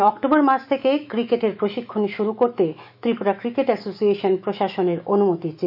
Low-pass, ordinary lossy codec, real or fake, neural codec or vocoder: 5.4 kHz; none; fake; autoencoder, 48 kHz, 128 numbers a frame, DAC-VAE, trained on Japanese speech